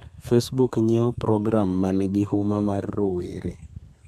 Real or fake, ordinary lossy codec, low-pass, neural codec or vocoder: fake; MP3, 96 kbps; 14.4 kHz; codec, 32 kHz, 1.9 kbps, SNAC